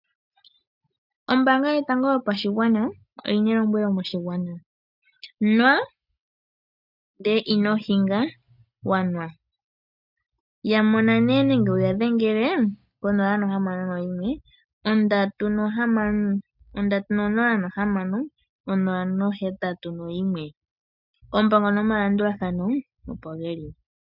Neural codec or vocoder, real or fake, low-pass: none; real; 5.4 kHz